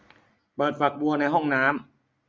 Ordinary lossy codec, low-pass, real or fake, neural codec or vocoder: none; none; real; none